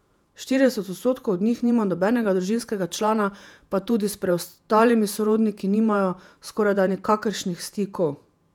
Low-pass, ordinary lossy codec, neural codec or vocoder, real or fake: 19.8 kHz; none; vocoder, 48 kHz, 128 mel bands, Vocos; fake